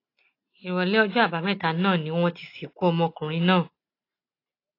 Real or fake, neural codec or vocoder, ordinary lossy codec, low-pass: real; none; AAC, 32 kbps; 5.4 kHz